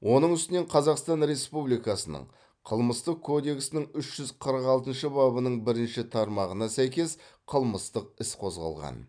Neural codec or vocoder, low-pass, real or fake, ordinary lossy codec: none; 9.9 kHz; real; none